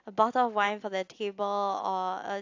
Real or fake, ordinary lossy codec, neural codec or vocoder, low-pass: real; AAC, 48 kbps; none; 7.2 kHz